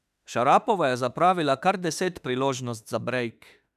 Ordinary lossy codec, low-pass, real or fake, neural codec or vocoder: none; 14.4 kHz; fake; autoencoder, 48 kHz, 32 numbers a frame, DAC-VAE, trained on Japanese speech